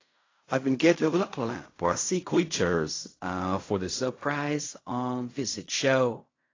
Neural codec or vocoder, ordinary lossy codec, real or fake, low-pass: codec, 16 kHz in and 24 kHz out, 0.4 kbps, LongCat-Audio-Codec, fine tuned four codebook decoder; AAC, 32 kbps; fake; 7.2 kHz